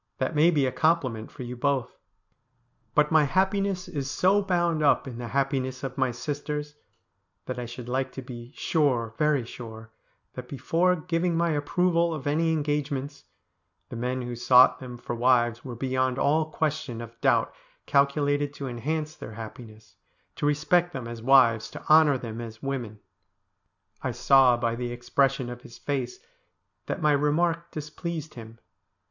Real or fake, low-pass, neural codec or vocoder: real; 7.2 kHz; none